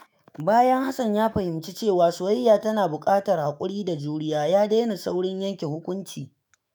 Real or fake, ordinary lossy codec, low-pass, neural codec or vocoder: fake; none; none; autoencoder, 48 kHz, 128 numbers a frame, DAC-VAE, trained on Japanese speech